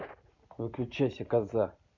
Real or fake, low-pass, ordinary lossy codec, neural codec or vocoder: real; 7.2 kHz; none; none